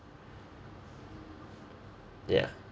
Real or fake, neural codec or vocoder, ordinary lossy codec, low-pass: real; none; none; none